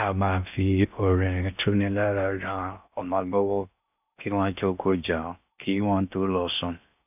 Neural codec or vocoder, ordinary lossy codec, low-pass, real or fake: codec, 16 kHz in and 24 kHz out, 0.6 kbps, FocalCodec, streaming, 4096 codes; none; 3.6 kHz; fake